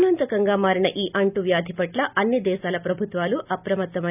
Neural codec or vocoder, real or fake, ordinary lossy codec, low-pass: none; real; none; 3.6 kHz